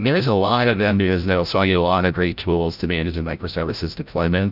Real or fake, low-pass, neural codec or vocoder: fake; 5.4 kHz; codec, 16 kHz, 0.5 kbps, FreqCodec, larger model